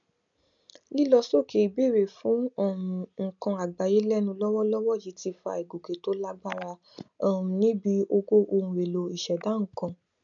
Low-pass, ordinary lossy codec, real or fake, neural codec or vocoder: 7.2 kHz; MP3, 96 kbps; real; none